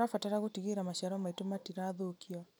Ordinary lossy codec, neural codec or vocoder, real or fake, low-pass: none; none; real; none